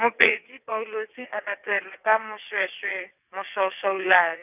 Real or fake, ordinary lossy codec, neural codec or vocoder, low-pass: fake; none; vocoder, 22.05 kHz, 80 mel bands, WaveNeXt; 3.6 kHz